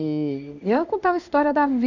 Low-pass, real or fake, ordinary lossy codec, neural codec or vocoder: 7.2 kHz; fake; none; codec, 16 kHz in and 24 kHz out, 1 kbps, XY-Tokenizer